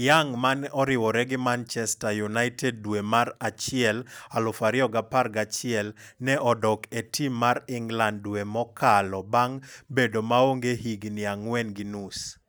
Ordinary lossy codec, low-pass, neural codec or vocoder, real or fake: none; none; none; real